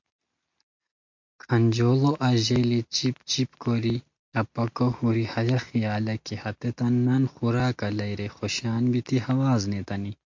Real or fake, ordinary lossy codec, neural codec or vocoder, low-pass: real; MP3, 48 kbps; none; 7.2 kHz